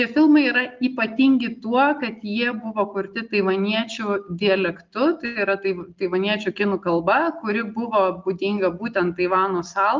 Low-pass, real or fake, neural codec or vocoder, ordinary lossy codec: 7.2 kHz; real; none; Opus, 24 kbps